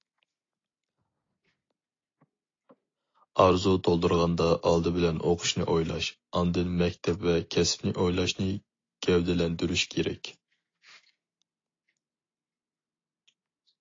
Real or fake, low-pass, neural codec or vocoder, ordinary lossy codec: real; 7.2 kHz; none; AAC, 32 kbps